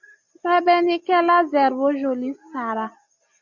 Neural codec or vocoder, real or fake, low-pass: none; real; 7.2 kHz